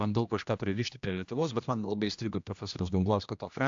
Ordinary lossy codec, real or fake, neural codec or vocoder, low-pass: AAC, 64 kbps; fake; codec, 16 kHz, 1 kbps, X-Codec, HuBERT features, trained on general audio; 7.2 kHz